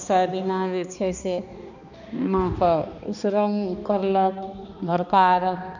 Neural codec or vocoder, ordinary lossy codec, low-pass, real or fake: codec, 16 kHz, 2 kbps, X-Codec, HuBERT features, trained on balanced general audio; none; 7.2 kHz; fake